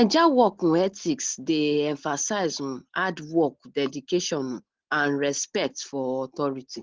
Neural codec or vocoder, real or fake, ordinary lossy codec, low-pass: none; real; Opus, 16 kbps; 7.2 kHz